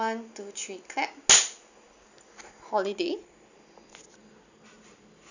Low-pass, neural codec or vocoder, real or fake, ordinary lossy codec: 7.2 kHz; none; real; none